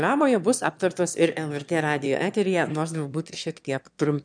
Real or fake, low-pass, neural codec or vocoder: fake; 9.9 kHz; autoencoder, 22.05 kHz, a latent of 192 numbers a frame, VITS, trained on one speaker